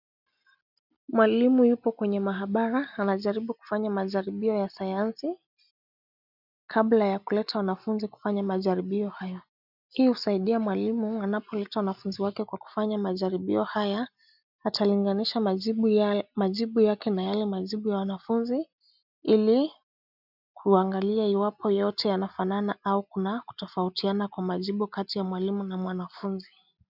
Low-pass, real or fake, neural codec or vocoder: 5.4 kHz; real; none